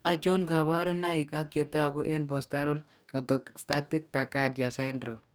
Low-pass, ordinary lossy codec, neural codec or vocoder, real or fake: none; none; codec, 44.1 kHz, 2.6 kbps, DAC; fake